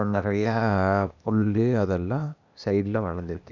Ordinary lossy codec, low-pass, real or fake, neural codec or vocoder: none; 7.2 kHz; fake; codec, 16 kHz, 0.8 kbps, ZipCodec